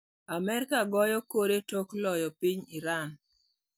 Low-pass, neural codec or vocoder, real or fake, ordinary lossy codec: none; none; real; none